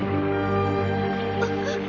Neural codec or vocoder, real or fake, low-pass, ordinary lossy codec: none; real; 7.2 kHz; none